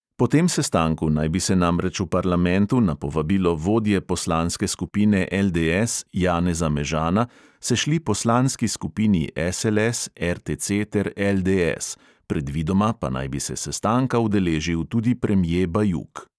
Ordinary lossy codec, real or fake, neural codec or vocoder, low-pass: none; real; none; none